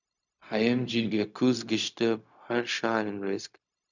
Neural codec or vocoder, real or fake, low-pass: codec, 16 kHz, 0.4 kbps, LongCat-Audio-Codec; fake; 7.2 kHz